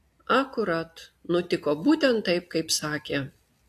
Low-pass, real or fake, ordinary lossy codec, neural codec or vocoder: 14.4 kHz; real; AAC, 64 kbps; none